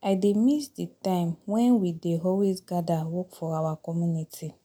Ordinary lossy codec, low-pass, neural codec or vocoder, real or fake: none; 19.8 kHz; none; real